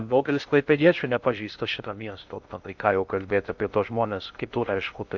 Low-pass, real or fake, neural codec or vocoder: 7.2 kHz; fake; codec, 16 kHz in and 24 kHz out, 0.6 kbps, FocalCodec, streaming, 2048 codes